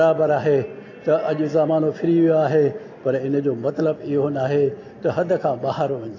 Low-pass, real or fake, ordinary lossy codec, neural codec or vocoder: 7.2 kHz; real; AAC, 32 kbps; none